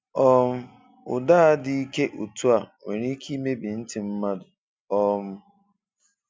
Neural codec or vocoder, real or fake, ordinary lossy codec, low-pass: none; real; none; none